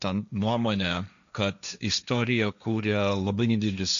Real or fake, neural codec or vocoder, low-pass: fake; codec, 16 kHz, 1.1 kbps, Voila-Tokenizer; 7.2 kHz